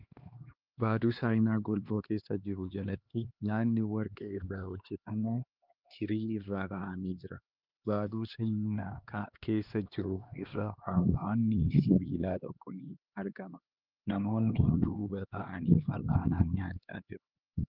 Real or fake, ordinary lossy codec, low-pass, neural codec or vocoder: fake; Opus, 24 kbps; 5.4 kHz; codec, 16 kHz, 2 kbps, X-Codec, HuBERT features, trained on LibriSpeech